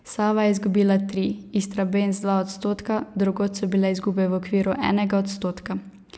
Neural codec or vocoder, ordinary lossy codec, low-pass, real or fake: none; none; none; real